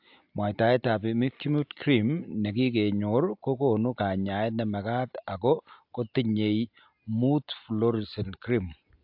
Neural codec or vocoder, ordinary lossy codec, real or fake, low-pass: none; AAC, 48 kbps; real; 5.4 kHz